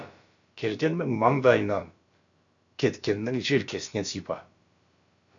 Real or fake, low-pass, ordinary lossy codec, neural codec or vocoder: fake; 7.2 kHz; AAC, 64 kbps; codec, 16 kHz, about 1 kbps, DyCAST, with the encoder's durations